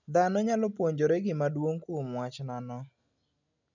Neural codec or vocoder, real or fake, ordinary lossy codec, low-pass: none; real; none; 7.2 kHz